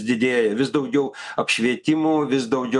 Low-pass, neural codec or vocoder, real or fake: 10.8 kHz; none; real